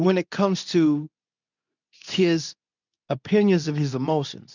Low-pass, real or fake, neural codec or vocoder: 7.2 kHz; fake; codec, 24 kHz, 0.9 kbps, WavTokenizer, medium speech release version 2